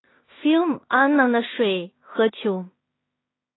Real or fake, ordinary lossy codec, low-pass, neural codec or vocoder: fake; AAC, 16 kbps; 7.2 kHz; codec, 16 kHz in and 24 kHz out, 0.4 kbps, LongCat-Audio-Codec, two codebook decoder